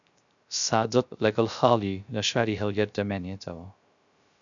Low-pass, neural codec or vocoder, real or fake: 7.2 kHz; codec, 16 kHz, 0.3 kbps, FocalCodec; fake